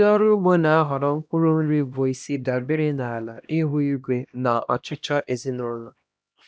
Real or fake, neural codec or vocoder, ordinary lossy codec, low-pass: fake; codec, 16 kHz, 1 kbps, X-Codec, HuBERT features, trained on LibriSpeech; none; none